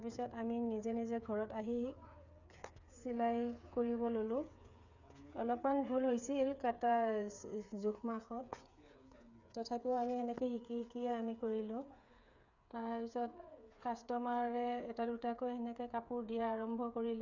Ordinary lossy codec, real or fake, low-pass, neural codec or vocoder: none; fake; 7.2 kHz; codec, 16 kHz, 8 kbps, FreqCodec, smaller model